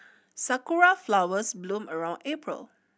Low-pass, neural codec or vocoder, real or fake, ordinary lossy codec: none; none; real; none